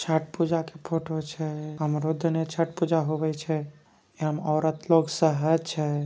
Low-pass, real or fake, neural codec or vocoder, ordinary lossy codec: none; real; none; none